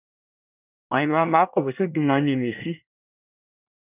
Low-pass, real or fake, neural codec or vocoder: 3.6 kHz; fake; codec, 24 kHz, 1 kbps, SNAC